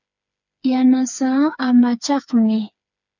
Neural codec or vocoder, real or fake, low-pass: codec, 16 kHz, 4 kbps, FreqCodec, smaller model; fake; 7.2 kHz